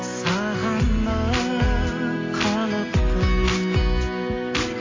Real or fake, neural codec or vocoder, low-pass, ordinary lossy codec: real; none; 7.2 kHz; AAC, 32 kbps